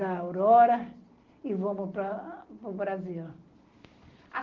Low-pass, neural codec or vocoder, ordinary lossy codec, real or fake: 7.2 kHz; none; Opus, 16 kbps; real